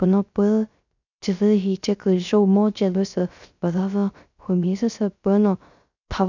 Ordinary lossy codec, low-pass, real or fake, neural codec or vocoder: none; 7.2 kHz; fake; codec, 16 kHz, 0.3 kbps, FocalCodec